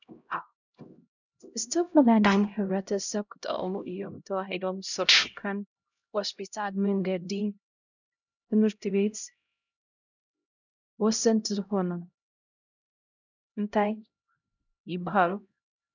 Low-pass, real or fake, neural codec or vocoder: 7.2 kHz; fake; codec, 16 kHz, 0.5 kbps, X-Codec, HuBERT features, trained on LibriSpeech